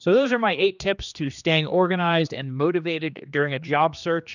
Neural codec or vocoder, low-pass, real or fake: codec, 16 kHz, 2 kbps, X-Codec, HuBERT features, trained on general audio; 7.2 kHz; fake